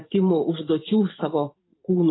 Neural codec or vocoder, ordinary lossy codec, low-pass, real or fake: vocoder, 44.1 kHz, 128 mel bands every 512 samples, BigVGAN v2; AAC, 16 kbps; 7.2 kHz; fake